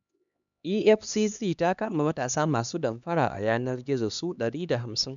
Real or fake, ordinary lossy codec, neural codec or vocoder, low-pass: fake; none; codec, 16 kHz, 2 kbps, X-Codec, HuBERT features, trained on LibriSpeech; 7.2 kHz